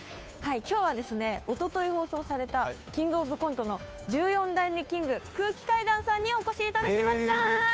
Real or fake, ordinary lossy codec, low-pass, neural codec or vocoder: fake; none; none; codec, 16 kHz, 2 kbps, FunCodec, trained on Chinese and English, 25 frames a second